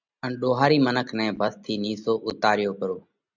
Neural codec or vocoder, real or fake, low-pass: none; real; 7.2 kHz